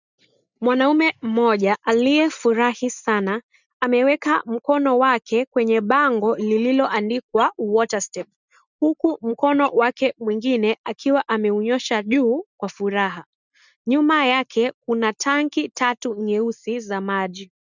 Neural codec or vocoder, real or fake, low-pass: none; real; 7.2 kHz